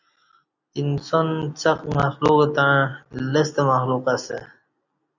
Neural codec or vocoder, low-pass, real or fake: none; 7.2 kHz; real